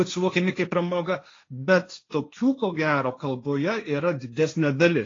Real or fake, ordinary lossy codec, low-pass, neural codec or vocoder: fake; AAC, 32 kbps; 7.2 kHz; codec, 16 kHz, 1.1 kbps, Voila-Tokenizer